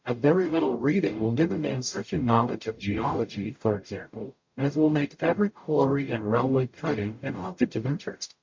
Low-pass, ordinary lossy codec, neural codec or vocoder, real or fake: 7.2 kHz; MP3, 48 kbps; codec, 44.1 kHz, 0.9 kbps, DAC; fake